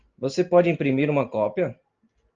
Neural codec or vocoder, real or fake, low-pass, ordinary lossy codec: none; real; 7.2 kHz; Opus, 24 kbps